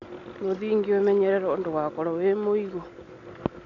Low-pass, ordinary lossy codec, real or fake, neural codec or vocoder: 7.2 kHz; none; real; none